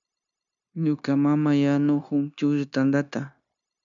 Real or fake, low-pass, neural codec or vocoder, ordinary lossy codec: fake; 7.2 kHz; codec, 16 kHz, 0.9 kbps, LongCat-Audio-Codec; MP3, 96 kbps